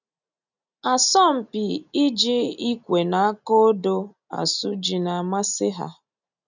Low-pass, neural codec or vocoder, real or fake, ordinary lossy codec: 7.2 kHz; none; real; none